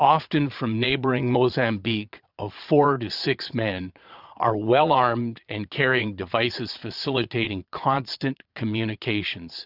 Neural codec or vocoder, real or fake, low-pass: vocoder, 22.05 kHz, 80 mel bands, Vocos; fake; 5.4 kHz